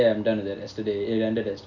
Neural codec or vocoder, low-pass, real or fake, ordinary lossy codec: none; 7.2 kHz; real; none